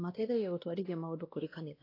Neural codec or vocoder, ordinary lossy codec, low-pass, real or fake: codec, 16 kHz, 1 kbps, X-Codec, WavLM features, trained on Multilingual LibriSpeech; AAC, 24 kbps; 5.4 kHz; fake